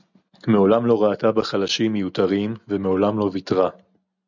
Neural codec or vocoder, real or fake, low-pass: none; real; 7.2 kHz